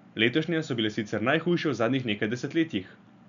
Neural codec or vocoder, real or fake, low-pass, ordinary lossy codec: none; real; 7.2 kHz; none